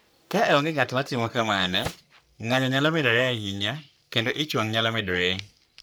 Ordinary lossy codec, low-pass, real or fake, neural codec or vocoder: none; none; fake; codec, 44.1 kHz, 3.4 kbps, Pupu-Codec